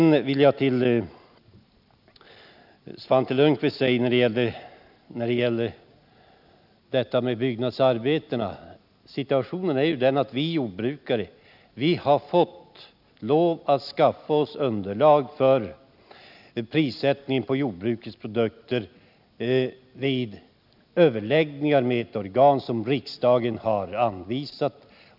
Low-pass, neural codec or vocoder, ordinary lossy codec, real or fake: 5.4 kHz; none; none; real